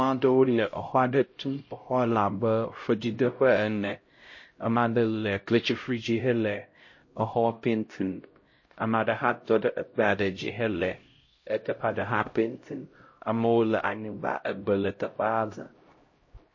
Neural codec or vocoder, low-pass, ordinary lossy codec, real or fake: codec, 16 kHz, 0.5 kbps, X-Codec, HuBERT features, trained on LibriSpeech; 7.2 kHz; MP3, 32 kbps; fake